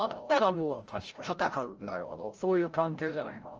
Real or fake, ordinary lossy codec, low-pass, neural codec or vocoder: fake; Opus, 24 kbps; 7.2 kHz; codec, 16 kHz, 0.5 kbps, FreqCodec, larger model